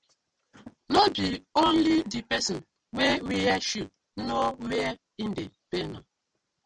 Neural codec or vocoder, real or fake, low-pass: none; real; 9.9 kHz